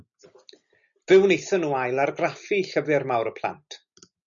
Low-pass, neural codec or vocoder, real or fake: 7.2 kHz; none; real